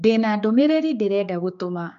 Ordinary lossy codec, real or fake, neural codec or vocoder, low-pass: none; fake; codec, 16 kHz, 4 kbps, X-Codec, HuBERT features, trained on general audio; 7.2 kHz